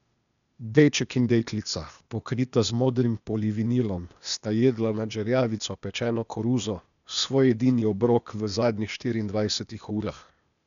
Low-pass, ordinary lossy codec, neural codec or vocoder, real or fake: 7.2 kHz; none; codec, 16 kHz, 0.8 kbps, ZipCodec; fake